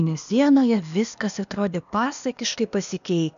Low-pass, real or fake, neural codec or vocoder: 7.2 kHz; fake; codec, 16 kHz, 0.8 kbps, ZipCodec